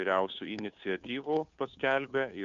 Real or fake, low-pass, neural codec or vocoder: fake; 7.2 kHz; codec, 16 kHz, 2 kbps, FunCodec, trained on Chinese and English, 25 frames a second